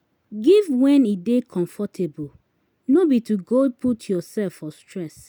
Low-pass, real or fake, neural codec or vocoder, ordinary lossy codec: 19.8 kHz; real; none; none